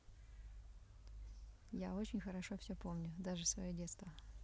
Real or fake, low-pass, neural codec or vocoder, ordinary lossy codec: real; none; none; none